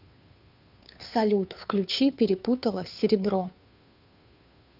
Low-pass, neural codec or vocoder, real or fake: 5.4 kHz; codec, 16 kHz, 2 kbps, FunCodec, trained on Chinese and English, 25 frames a second; fake